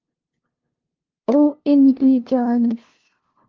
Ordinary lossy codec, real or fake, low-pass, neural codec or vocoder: Opus, 32 kbps; fake; 7.2 kHz; codec, 16 kHz, 0.5 kbps, FunCodec, trained on LibriTTS, 25 frames a second